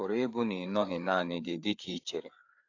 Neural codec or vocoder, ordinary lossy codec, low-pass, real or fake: codec, 16 kHz, 4 kbps, FreqCodec, larger model; AAC, 48 kbps; 7.2 kHz; fake